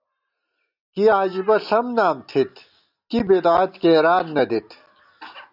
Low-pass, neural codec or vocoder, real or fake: 5.4 kHz; none; real